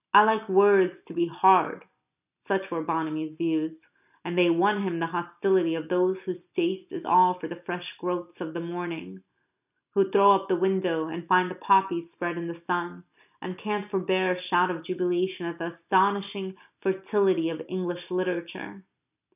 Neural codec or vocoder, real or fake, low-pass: none; real; 3.6 kHz